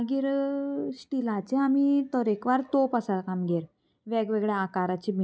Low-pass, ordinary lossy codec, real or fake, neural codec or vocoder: none; none; real; none